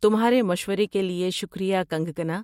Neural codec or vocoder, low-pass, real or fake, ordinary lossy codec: none; 19.8 kHz; real; MP3, 64 kbps